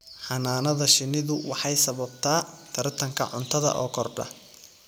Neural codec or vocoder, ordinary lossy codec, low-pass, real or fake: none; none; none; real